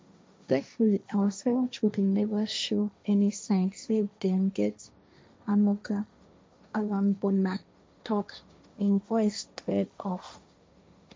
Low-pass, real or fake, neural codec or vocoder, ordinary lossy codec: none; fake; codec, 16 kHz, 1.1 kbps, Voila-Tokenizer; none